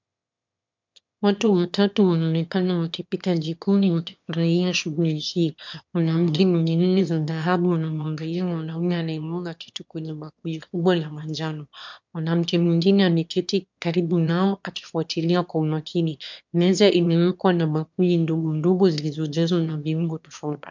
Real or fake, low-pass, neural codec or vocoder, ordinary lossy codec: fake; 7.2 kHz; autoencoder, 22.05 kHz, a latent of 192 numbers a frame, VITS, trained on one speaker; MP3, 64 kbps